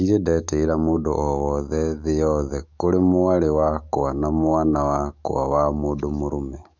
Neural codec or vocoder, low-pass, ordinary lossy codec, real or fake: autoencoder, 48 kHz, 128 numbers a frame, DAC-VAE, trained on Japanese speech; 7.2 kHz; none; fake